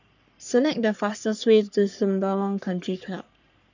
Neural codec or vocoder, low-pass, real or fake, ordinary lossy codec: codec, 44.1 kHz, 3.4 kbps, Pupu-Codec; 7.2 kHz; fake; none